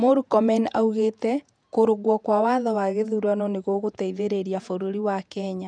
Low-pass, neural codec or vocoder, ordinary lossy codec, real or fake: 9.9 kHz; vocoder, 48 kHz, 128 mel bands, Vocos; none; fake